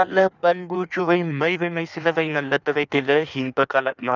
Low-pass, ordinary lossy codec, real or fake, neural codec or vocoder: 7.2 kHz; none; fake; codec, 16 kHz in and 24 kHz out, 1.1 kbps, FireRedTTS-2 codec